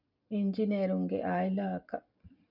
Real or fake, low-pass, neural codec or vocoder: real; 5.4 kHz; none